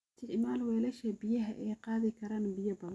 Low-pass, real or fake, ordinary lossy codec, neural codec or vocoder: none; real; none; none